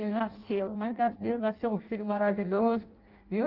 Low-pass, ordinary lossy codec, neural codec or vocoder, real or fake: 5.4 kHz; Opus, 24 kbps; codec, 16 kHz in and 24 kHz out, 0.6 kbps, FireRedTTS-2 codec; fake